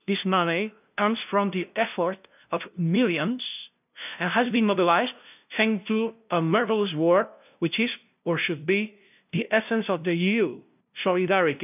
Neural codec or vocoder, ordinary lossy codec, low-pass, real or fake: codec, 16 kHz, 0.5 kbps, FunCodec, trained on LibriTTS, 25 frames a second; none; 3.6 kHz; fake